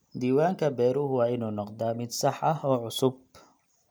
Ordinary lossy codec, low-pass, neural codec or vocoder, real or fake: none; none; none; real